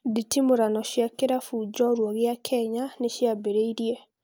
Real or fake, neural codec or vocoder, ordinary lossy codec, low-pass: real; none; none; none